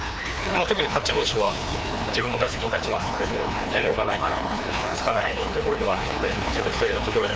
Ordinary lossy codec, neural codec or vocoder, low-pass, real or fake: none; codec, 16 kHz, 2 kbps, FreqCodec, larger model; none; fake